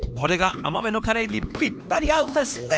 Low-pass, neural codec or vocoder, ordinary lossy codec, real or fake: none; codec, 16 kHz, 2 kbps, X-Codec, HuBERT features, trained on LibriSpeech; none; fake